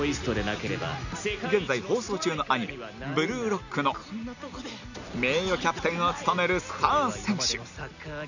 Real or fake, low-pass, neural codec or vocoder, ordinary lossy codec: real; 7.2 kHz; none; none